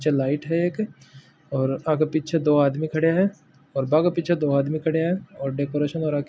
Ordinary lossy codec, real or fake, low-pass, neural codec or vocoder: none; real; none; none